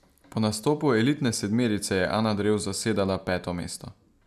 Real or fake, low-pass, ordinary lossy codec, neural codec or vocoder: real; 14.4 kHz; none; none